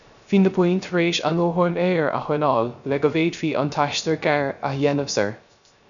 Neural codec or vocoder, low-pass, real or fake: codec, 16 kHz, 0.3 kbps, FocalCodec; 7.2 kHz; fake